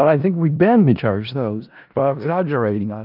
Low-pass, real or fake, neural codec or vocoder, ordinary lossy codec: 5.4 kHz; fake; codec, 16 kHz in and 24 kHz out, 0.4 kbps, LongCat-Audio-Codec, four codebook decoder; Opus, 24 kbps